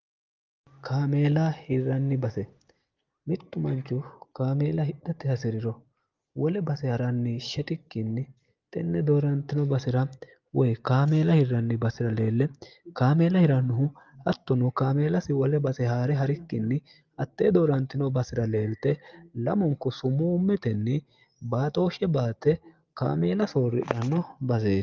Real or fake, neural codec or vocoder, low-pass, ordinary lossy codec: real; none; 7.2 kHz; Opus, 32 kbps